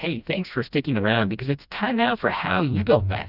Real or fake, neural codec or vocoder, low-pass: fake; codec, 16 kHz, 1 kbps, FreqCodec, smaller model; 5.4 kHz